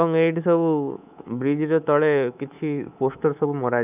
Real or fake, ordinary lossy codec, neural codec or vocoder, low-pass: fake; none; codec, 24 kHz, 3.1 kbps, DualCodec; 3.6 kHz